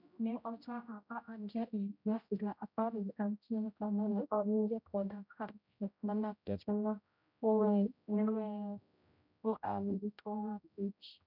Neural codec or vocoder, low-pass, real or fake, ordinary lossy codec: codec, 16 kHz, 0.5 kbps, X-Codec, HuBERT features, trained on general audio; 5.4 kHz; fake; none